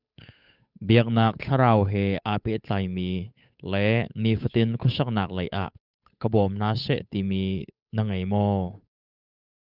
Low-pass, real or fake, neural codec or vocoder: 5.4 kHz; fake; codec, 16 kHz, 8 kbps, FunCodec, trained on Chinese and English, 25 frames a second